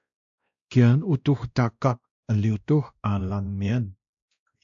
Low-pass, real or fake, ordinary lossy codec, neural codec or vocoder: 7.2 kHz; fake; AAC, 64 kbps; codec, 16 kHz, 1 kbps, X-Codec, WavLM features, trained on Multilingual LibriSpeech